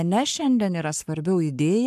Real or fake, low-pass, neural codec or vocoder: fake; 14.4 kHz; codec, 44.1 kHz, 7.8 kbps, Pupu-Codec